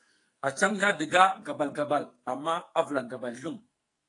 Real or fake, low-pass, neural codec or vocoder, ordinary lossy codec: fake; 10.8 kHz; codec, 44.1 kHz, 2.6 kbps, SNAC; AAC, 48 kbps